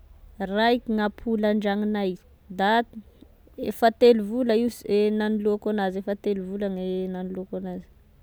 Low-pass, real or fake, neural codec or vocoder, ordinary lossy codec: none; real; none; none